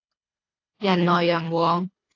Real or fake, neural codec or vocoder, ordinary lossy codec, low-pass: fake; codec, 24 kHz, 1.5 kbps, HILCodec; AAC, 32 kbps; 7.2 kHz